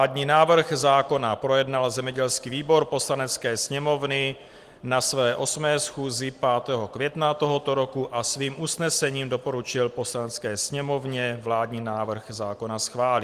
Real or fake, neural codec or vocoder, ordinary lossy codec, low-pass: real; none; Opus, 24 kbps; 14.4 kHz